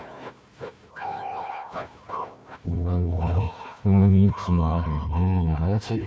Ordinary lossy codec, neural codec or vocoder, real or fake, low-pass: none; codec, 16 kHz, 1 kbps, FunCodec, trained on Chinese and English, 50 frames a second; fake; none